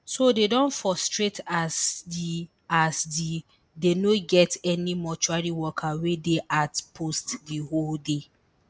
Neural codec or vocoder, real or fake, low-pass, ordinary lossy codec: none; real; none; none